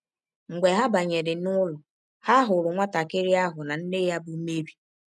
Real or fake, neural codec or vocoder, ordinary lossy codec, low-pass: real; none; none; none